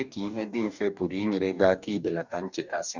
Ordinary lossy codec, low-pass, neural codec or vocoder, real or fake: none; 7.2 kHz; codec, 44.1 kHz, 2.6 kbps, DAC; fake